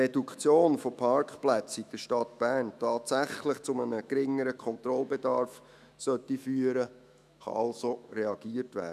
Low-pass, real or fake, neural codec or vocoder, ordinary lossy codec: 14.4 kHz; fake; autoencoder, 48 kHz, 128 numbers a frame, DAC-VAE, trained on Japanese speech; none